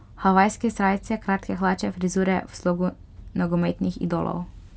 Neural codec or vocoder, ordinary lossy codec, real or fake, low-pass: none; none; real; none